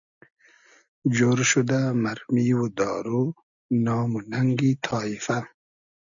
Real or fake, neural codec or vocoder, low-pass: real; none; 7.2 kHz